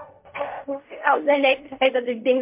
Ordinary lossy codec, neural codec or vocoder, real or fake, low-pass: MP3, 32 kbps; codec, 16 kHz in and 24 kHz out, 0.4 kbps, LongCat-Audio-Codec, fine tuned four codebook decoder; fake; 3.6 kHz